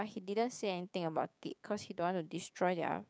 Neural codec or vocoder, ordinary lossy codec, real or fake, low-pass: codec, 16 kHz, 6 kbps, DAC; none; fake; none